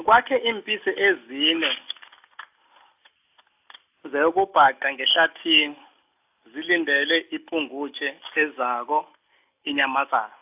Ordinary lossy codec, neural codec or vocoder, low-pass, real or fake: AAC, 32 kbps; none; 3.6 kHz; real